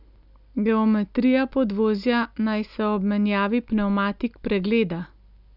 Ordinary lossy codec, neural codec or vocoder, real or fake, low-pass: none; none; real; 5.4 kHz